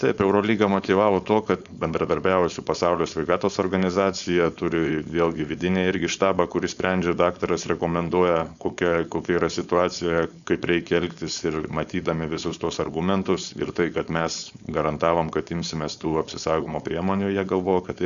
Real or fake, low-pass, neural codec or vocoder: fake; 7.2 kHz; codec, 16 kHz, 4.8 kbps, FACodec